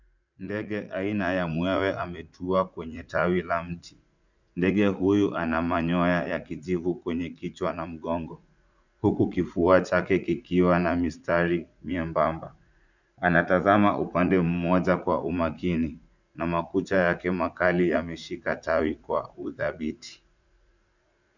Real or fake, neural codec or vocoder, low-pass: fake; vocoder, 44.1 kHz, 80 mel bands, Vocos; 7.2 kHz